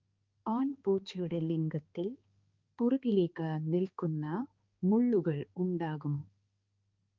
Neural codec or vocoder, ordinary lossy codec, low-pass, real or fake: codec, 16 kHz, 2 kbps, X-Codec, HuBERT features, trained on balanced general audio; Opus, 24 kbps; 7.2 kHz; fake